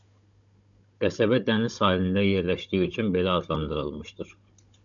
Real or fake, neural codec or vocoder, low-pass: fake; codec, 16 kHz, 16 kbps, FunCodec, trained on Chinese and English, 50 frames a second; 7.2 kHz